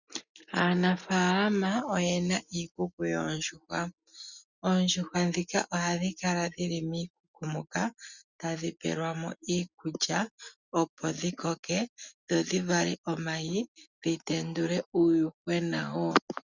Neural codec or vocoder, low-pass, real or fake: none; 7.2 kHz; real